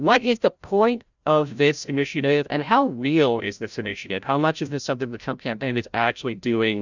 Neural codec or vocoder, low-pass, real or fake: codec, 16 kHz, 0.5 kbps, FreqCodec, larger model; 7.2 kHz; fake